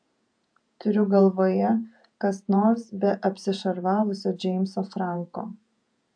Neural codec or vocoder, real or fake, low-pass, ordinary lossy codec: vocoder, 48 kHz, 128 mel bands, Vocos; fake; 9.9 kHz; AAC, 64 kbps